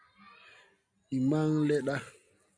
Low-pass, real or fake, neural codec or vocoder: 9.9 kHz; real; none